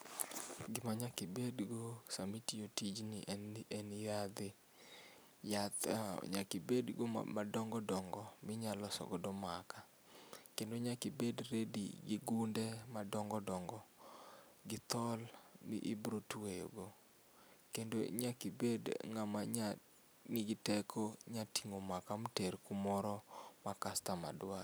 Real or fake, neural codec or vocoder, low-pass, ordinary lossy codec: real; none; none; none